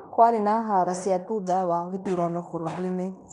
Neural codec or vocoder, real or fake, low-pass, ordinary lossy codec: codec, 16 kHz in and 24 kHz out, 0.9 kbps, LongCat-Audio-Codec, fine tuned four codebook decoder; fake; 10.8 kHz; none